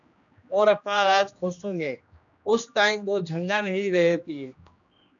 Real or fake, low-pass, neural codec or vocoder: fake; 7.2 kHz; codec, 16 kHz, 1 kbps, X-Codec, HuBERT features, trained on general audio